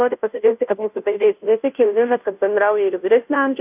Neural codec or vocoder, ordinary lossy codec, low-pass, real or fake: codec, 16 kHz, 0.5 kbps, FunCodec, trained on Chinese and English, 25 frames a second; AAC, 32 kbps; 3.6 kHz; fake